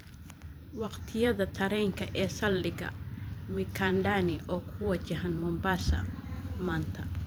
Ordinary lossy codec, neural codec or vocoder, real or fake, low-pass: none; vocoder, 44.1 kHz, 128 mel bands every 512 samples, BigVGAN v2; fake; none